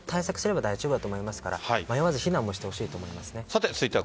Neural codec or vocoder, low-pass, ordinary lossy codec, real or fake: none; none; none; real